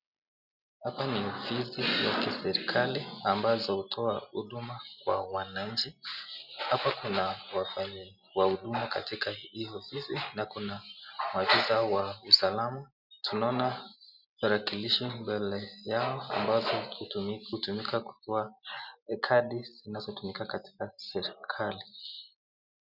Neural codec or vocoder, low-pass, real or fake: none; 5.4 kHz; real